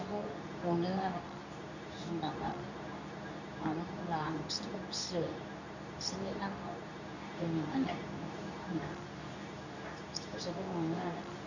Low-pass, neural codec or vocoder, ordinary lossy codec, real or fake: 7.2 kHz; codec, 24 kHz, 0.9 kbps, WavTokenizer, medium speech release version 2; none; fake